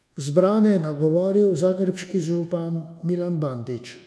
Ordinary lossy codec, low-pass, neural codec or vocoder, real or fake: none; none; codec, 24 kHz, 1.2 kbps, DualCodec; fake